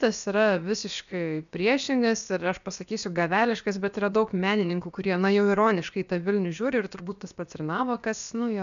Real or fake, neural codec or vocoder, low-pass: fake; codec, 16 kHz, about 1 kbps, DyCAST, with the encoder's durations; 7.2 kHz